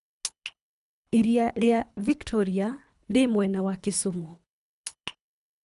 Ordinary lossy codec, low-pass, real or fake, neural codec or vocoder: none; 10.8 kHz; fake; codec, 24 kHz, 3 kbps, HILCodec